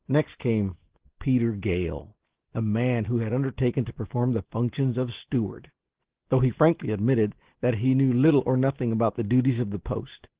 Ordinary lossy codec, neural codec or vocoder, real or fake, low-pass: Opus, 16 kbps; none; real; 3.6 kHz